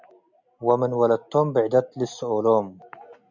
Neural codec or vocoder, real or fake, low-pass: none; real; 7.2 kHz